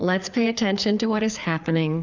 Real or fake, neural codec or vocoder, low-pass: fake; codec, 16 kHz, 4 kbps, FreqCodec, larger model; 7.2 kHz